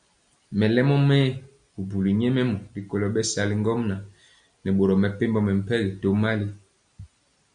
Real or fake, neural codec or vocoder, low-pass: real; none; 9.9 kHz